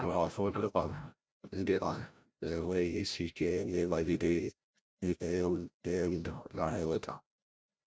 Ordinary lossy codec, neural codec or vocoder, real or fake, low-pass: none; codec, 16 kHz, 0.5 kbps, FreqCodec, larger model; fake; none